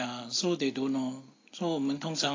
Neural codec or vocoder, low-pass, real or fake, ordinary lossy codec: none; 7.2 kHz; real; AAC, 32 kbps